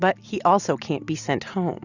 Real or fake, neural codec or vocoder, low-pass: real; none; 7.2 kHz